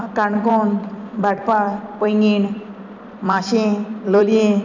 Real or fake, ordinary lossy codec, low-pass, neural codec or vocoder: fake; none; 7.2 kHz; vocoder, 44.1 kHz, 128 mel bands every 256 samples, BigVGAN v2